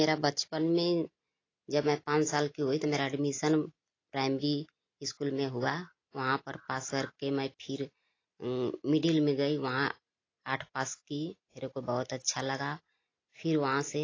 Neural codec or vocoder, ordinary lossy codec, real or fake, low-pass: none; AAC, 32 kbps; real; 7.2 kHz